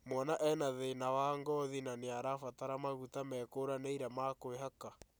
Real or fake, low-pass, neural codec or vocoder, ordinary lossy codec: real; none; none; none